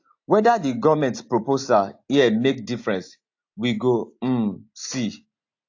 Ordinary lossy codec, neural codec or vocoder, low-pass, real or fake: AAC, 48 kbps; none; 7.2 kHz; real